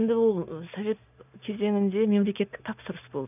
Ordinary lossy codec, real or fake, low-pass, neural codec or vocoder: none; real; 3.6 kHz; none